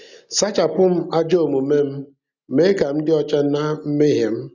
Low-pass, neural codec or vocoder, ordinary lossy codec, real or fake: 7.2 kHz; none; none; real